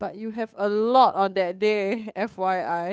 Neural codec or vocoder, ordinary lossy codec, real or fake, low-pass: codec, 16 kHz, 2 kbps, FunCodec, trained on Chinese and English, 25 frames a second; none; fake; none